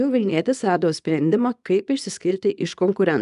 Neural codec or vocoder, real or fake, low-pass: codec, 24 kHz, 0.9 kbps, WavTokenizer, small release; fake; 10.8 kHz